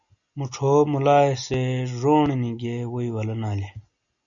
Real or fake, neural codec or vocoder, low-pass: real; none; 7.2 kHz